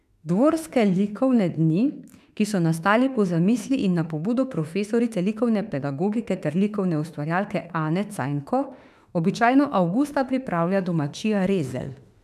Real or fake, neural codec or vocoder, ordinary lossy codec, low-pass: fake; autoencoder, 48 kHz, 32 numbers a frame, DAC-VAE, trained on Japanese speech; none; 14.4 kHz